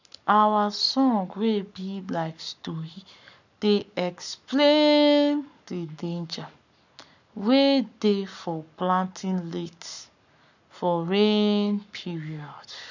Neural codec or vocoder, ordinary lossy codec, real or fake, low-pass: codec, 44.1 kHz, 7.8 kbps, Pupu-Codec; none; fake; 7.2 kHz